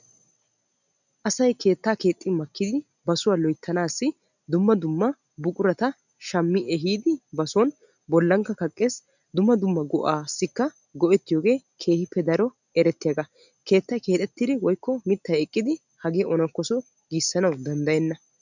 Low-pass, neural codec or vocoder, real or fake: 7.2 kHz; none; real